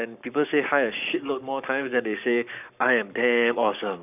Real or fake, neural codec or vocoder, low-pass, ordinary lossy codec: fake; codec, 44.1 kHz, 7.8 kbps, Pupu-Codec; 3.6 kHz; none